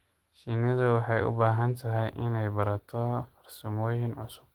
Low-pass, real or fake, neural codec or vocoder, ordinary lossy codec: 19.8 kHz; fake; autoencoder, 48 kHz, 128 numbers a frame, DAC-VAE, trained on Japanese speech; Opus, 16 kbps